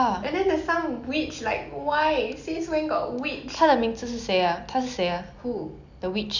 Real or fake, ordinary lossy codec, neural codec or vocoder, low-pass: real; none; none; 7.2 kHz